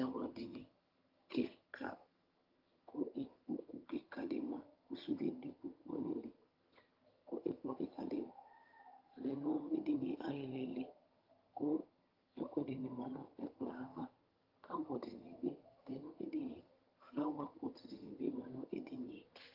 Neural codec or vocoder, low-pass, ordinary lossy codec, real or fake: vocoder, 22.05 kHz, 80 mel bands, HiFi-GAN; 5.4 kHz; Opus, 32 kbps; fake